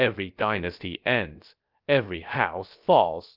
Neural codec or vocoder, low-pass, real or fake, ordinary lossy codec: codec, 16 kHz, about 1 kbps, DyCAST, with the encoder's durations; 5.4 kHz; fake; Opus, 32 kbps